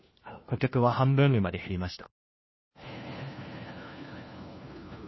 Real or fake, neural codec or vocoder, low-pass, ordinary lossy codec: fake; codec, 16 kHz, 1 kbps, FunCodec, trained on LibriTTS, 50 frames a second; 7.2 kHz; MP3, 24 kbps